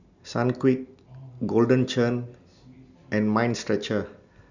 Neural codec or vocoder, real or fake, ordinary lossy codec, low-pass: none; real; none; 7.2 kHz